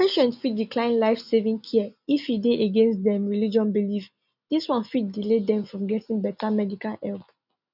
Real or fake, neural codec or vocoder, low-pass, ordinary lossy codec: real; none; 5.4 kHz; none